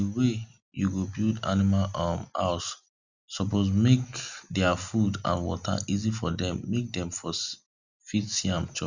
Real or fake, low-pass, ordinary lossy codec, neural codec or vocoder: real; 7.2 kHz; none; none